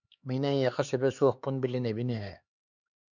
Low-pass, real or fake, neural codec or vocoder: 7.2 kHz; fake; codec, 16 kHz, 4 kbps, X-Codec, HuBERT features, trained on LibriSpeech